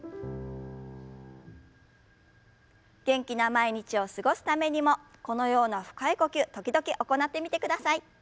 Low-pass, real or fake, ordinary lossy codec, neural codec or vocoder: none; real; none; none